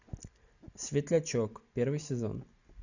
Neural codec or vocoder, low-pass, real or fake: none; 7.2 kHz; real